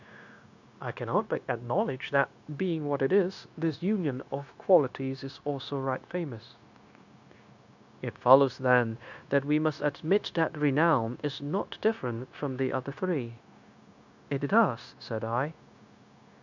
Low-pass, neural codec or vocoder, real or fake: 7.2 kHz; codec, 16 kHz, 0.9 kbps, LongCat-Audio-Codec; fake